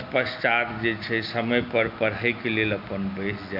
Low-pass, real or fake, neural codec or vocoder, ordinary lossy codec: 5.4 kHz; real; none; MP3, 48 kbps